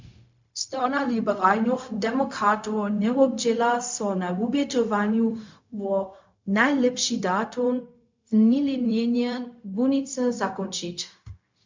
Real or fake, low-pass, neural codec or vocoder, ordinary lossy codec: fake; 7.2 kHz; codec, 16 kHz, 0.4 kbps, LongCat-Audio-Codec; MP3, 64 kbps